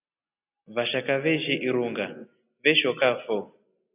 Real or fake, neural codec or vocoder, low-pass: real; none; 3.6 kHz